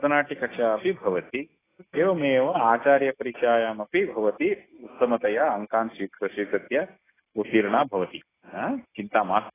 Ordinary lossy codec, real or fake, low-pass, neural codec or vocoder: AAC, 16 kbps; real; 3.6 kHz; none